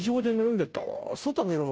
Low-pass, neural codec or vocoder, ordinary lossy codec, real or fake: none; codec, 16 kHz, 0.5 kbps, FunCodec, trained on Chinese and English, 25 frames a second; none; fake